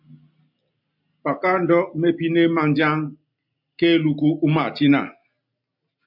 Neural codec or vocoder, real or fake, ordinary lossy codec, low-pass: none; real; MP3, 48 kbps; 5.4 kHz